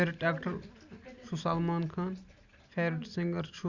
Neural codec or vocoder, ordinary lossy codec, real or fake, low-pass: none; Opus, 64 kbps; real; 7.2 kHz